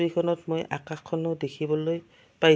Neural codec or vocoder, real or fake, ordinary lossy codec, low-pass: none; real; none; none